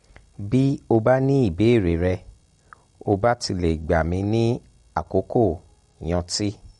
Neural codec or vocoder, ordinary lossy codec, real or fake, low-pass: none; MP3, 48 kbps; real; 19.8 kHz